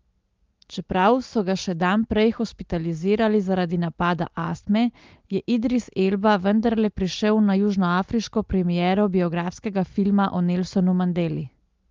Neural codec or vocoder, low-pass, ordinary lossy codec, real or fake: none; 7.2 kHz; Opus, 32 kbps; real